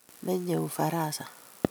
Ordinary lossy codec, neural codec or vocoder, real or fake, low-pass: none; none; real; none